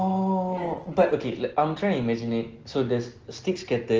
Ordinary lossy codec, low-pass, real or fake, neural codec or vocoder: Opus, 16 kbps; 7.2 kHz; real; none